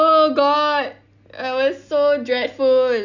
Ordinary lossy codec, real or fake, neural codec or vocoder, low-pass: none; real; none; 7.2 kHz